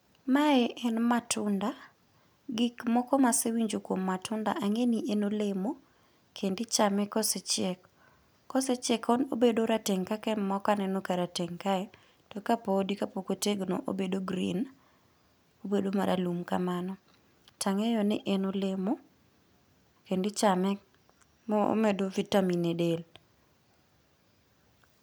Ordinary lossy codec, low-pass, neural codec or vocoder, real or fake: none; none; none; real